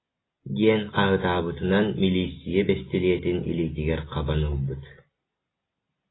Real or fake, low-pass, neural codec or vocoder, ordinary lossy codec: real; 7.2 kHz; none; AAC, 16 kbps